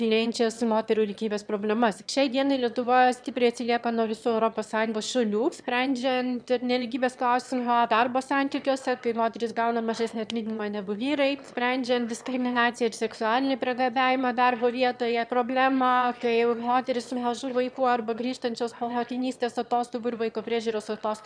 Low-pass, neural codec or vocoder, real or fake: 9.9 kHz; autoencoder, 22.05 kHz, a latent of 192 numbers a frame, VITS, trained on one speaker; fake